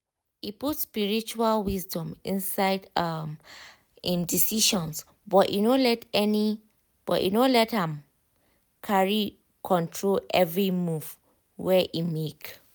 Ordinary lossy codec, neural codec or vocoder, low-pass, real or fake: none; none; none; real